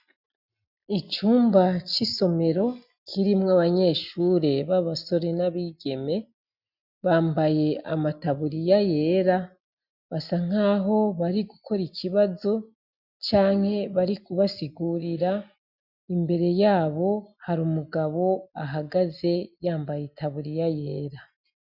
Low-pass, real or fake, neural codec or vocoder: 5.4 kHz; real; none